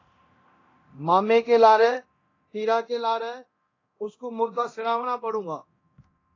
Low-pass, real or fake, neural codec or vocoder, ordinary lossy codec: 7.2 kHz; fake; codec, 24 kHz, 0.9 kbps, DualCodec; AAC, 32 kbps